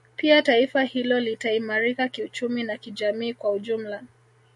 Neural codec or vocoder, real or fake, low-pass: none; real; 10.8 kHz